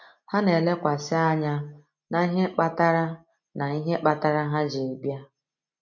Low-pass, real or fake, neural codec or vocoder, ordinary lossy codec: 7.2 kHz; real; none; MP3, 48 kbps